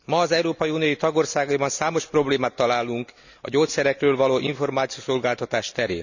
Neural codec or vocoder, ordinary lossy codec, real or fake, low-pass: none; none; real; 7.2 kHz